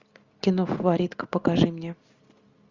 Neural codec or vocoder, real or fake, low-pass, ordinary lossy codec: none; real; 7.2 kHz; Opus, 64 kbps